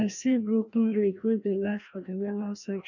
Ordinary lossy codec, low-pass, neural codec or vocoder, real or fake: none; 7.2 kHz; codec, 16 kHz, 1 kbps, FreqCodec, larger model; fake